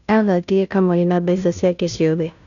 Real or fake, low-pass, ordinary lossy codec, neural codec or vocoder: fake; 7.2 kHz; none; codec, 16 kHz, 0.5 kbps, FunCodec, trained on Chinese and English, 25 frames a second